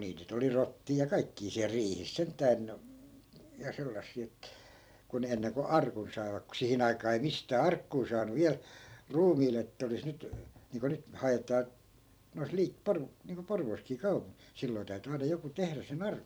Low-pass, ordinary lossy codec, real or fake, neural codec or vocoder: none; none; fake; vocoder, 44.1 kHz, 128 mel bands every 256 samples, BigVGAN v2